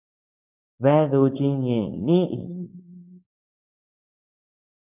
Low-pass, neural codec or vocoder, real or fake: 3.6 kHz; codec, 16 kHz, 4.8 kbps, FACodec; fake